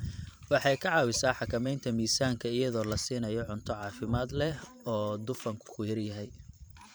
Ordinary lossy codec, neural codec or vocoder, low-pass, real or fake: none; vocoder, 44.1 kHz, 128 mel bands every 512 samples, BigVGAN v2; none; fake